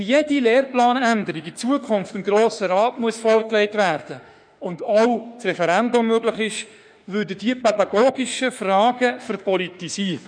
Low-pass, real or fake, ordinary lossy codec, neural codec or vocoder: 9.9 kHz; fake; none; autoencoder, 48 kHz, 32 numbers a frame, DAC-VAE, trained on Japanese speech